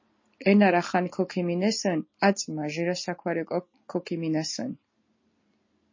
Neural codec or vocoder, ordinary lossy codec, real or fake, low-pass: none; MP3, 32 kbps; real; 7.2 kHz